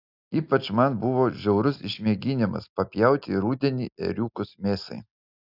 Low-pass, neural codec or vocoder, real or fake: 5.4 kHz; none; real